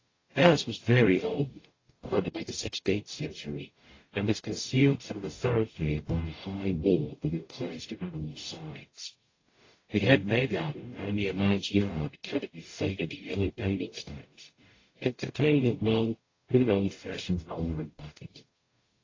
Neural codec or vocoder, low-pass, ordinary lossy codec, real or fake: codec, 44.1 kHz, 0.9 kbps, DAC; 7.2 kHz; AAC, 32 kbps; fake